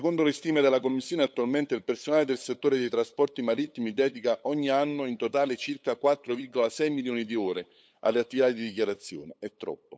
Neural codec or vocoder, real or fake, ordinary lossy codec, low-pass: codec, 16 kHz, 8 kbps, FunCodec, trained on LibriTTS, 25 frames a second; fake; none; none